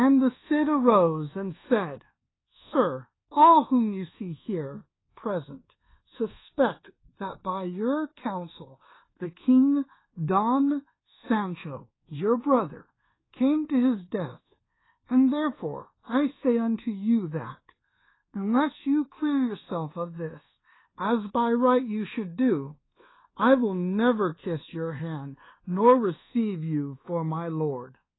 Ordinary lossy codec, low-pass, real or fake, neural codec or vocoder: AAC, 16 kbps; 7.2 kHz; fake; codec, 24 kHz, 1.2 kbps, DualCodec